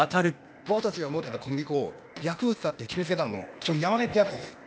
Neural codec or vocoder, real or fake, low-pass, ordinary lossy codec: codec, 16 kHz, 0.8 kbps, ZipCodec; fake; none; none